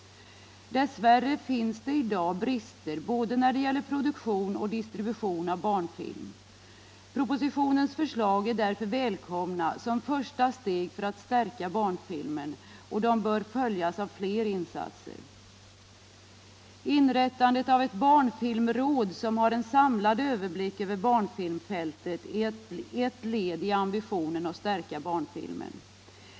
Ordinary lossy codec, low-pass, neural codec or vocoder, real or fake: none; none; none; real